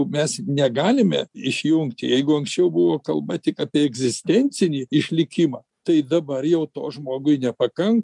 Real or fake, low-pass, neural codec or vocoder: real; 10.8 kHz; none